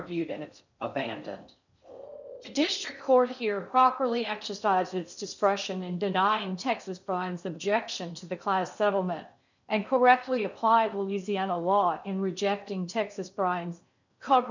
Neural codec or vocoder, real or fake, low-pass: codec, 16 kHz in and 24 kHz out, 0.6 kbps, FocalCodec, streaming, 4096 codes; fake; 7.2 kHz